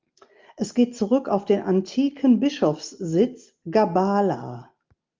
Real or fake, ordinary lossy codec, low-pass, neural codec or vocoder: real; Opus, 24 kbps; 7.2 kHz; none